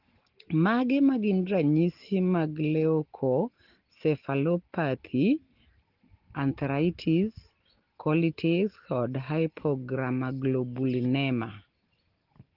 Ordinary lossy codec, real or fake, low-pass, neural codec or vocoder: Opus, 16 kbps; real; 5.4 kHz; none